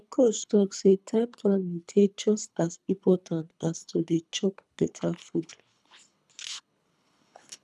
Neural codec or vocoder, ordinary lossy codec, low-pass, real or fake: codec, 24 kHz, 3 kbps, HILCodec; none; none; fake